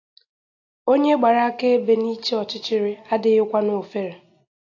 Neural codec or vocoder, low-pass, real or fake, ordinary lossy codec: none; 7.2 kHz; real; AAC, 48 kbps